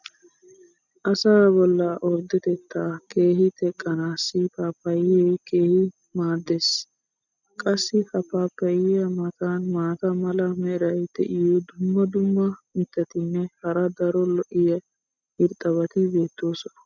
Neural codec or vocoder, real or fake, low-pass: none; real; 7.2 kHz